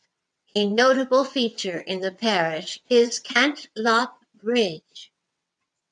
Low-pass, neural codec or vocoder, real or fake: 9.9 kHz; vocoder, 22.05 kHz, 80 mel bands, WaveNeXt; fake